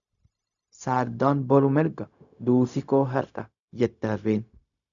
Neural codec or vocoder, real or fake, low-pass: codec, 16 kHz, 0.4 kbps, LongCat-Audio-Codec; fake; 7.2 kHz